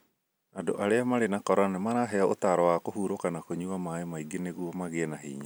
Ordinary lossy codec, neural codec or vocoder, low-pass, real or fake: none; none; none; real